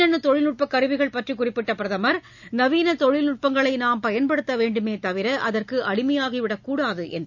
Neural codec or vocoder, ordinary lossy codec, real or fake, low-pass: none; none; real; 7.2 kHz